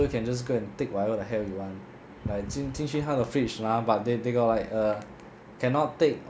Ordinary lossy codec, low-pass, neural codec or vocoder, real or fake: none; none; none; real